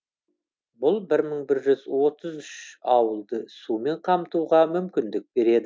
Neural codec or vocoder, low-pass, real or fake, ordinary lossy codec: none; none; real; none